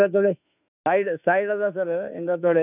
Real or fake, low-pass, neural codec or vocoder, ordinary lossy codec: fake; 3.6 kHz; autoencoder, 48 kHz, 32 numbers a frame, DAC-VAE, trained on Japanese speech; none